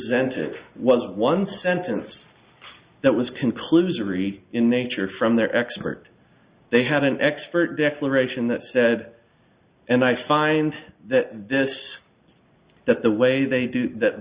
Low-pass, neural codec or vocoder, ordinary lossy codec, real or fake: 3.6 kHz; none; Opus, 64 kbps; real